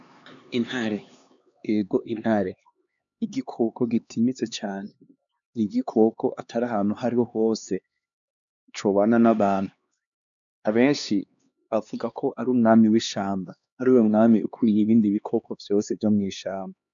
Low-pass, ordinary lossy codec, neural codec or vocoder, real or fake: 7.2 kHz; MP3, 96 kbps; codec, 16 kHz, 2 kbps, X-Codec, HuBERT features, trained on LibriSpeech; fake